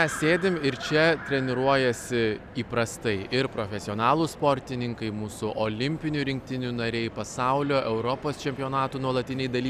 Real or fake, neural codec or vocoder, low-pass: real; none; 14.4 kHz